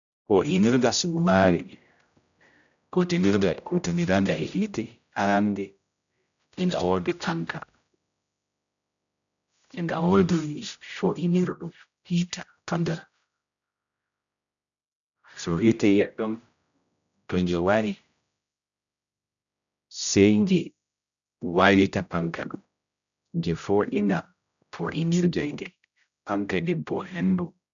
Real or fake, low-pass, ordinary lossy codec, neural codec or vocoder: fake; 7.2 kHz; none; codec, 16 kHz, 0.5 kbps, X-Codec, HuBERT features, trained on general audio